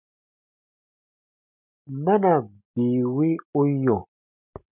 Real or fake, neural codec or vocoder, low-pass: real; none; 3.6 kHz